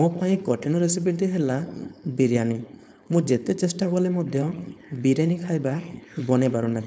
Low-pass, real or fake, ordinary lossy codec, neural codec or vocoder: none; fake; none; codec, 16 kHz, 4.8 kbps, FACodec